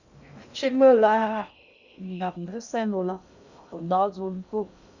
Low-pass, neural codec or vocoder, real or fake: 7.2 kHz; codec, 16 kHz in and 24 kHz out, 0.6 kbps, FocalCodec, streaming, 2048 codes; fake